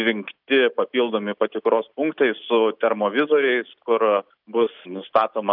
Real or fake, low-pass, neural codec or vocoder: real; 5.4 kHz; none